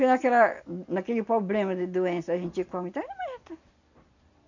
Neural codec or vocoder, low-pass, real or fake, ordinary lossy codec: none; 7.2 kHz; real; none